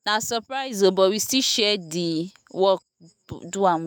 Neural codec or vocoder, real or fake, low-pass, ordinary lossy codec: autoencoder, 48 kHz, 128 numbers a frame, DAC-VAE, trained on Japanese speech; fake; none; none